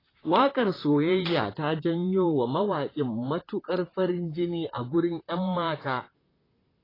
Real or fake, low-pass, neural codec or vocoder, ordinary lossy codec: fake; 5.4 kHz; vocoder, 44.1 kHz, 128 mel bands, Pupu-Vocoder; AAC, 24 kbps